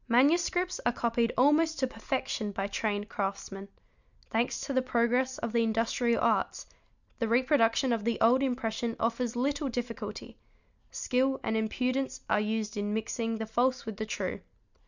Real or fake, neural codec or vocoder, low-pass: real; none; 7.2 kHz